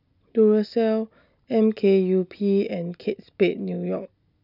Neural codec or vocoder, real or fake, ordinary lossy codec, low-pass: none; real; none; 5.4 kHz